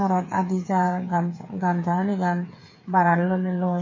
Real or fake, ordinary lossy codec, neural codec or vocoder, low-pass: fake; MP3, 32 kbps; codec, 16 kHz, 8 kbps, FreqCodec, smaller model; 7.2 kHz